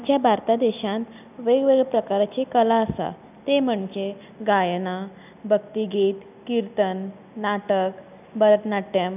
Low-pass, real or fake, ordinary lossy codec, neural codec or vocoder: 3.6 kHz; real; none; none